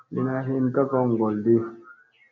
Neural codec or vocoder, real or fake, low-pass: none; real; 7.2 kHz